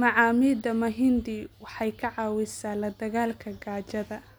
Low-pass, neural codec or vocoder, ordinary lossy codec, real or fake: none; none; none; real